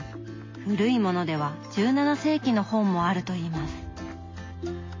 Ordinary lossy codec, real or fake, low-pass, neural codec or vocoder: MP3, 32 kbps; real; 7.2 kHz; none